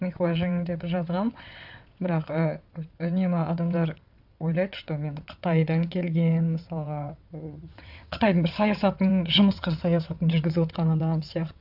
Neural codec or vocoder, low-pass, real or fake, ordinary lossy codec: vocoder, 22.05 kHz, 80 mel bands, WaveNeXt; 5.4 kHz; fake; AAC, 48 kbps